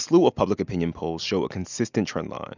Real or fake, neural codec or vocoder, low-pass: real; none; 7.2 kHz